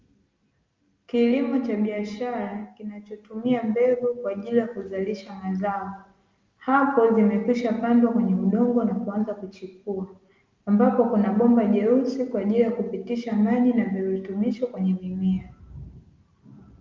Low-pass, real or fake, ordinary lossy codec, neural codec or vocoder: 7.2 kHz; real; Opus, 32 kbps; none